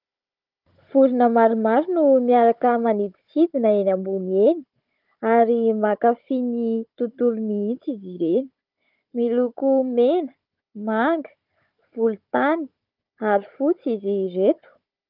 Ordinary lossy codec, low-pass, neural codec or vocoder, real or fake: Opus, 24 kbps; 5.4 kHz; codec, 16 kHz, 16 kbps, FunCodec, trained on Chinese and English, 50 frames a second; fake